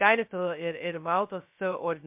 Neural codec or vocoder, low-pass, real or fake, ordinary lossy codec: codec, 16 kHz, 0.2 kbps, FocalCodec; 3.6 kHz; fake; MP3, 32 kbps